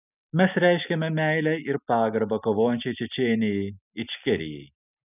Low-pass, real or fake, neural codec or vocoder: 3.6 kHz; real; none